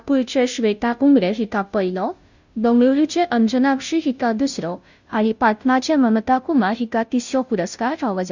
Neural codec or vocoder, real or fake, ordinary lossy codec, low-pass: codec, 16 kHz, 0.5 kbps, FunCodec, trained on Chinese and English, 25 frames a second; fake; none; 7.2 kHz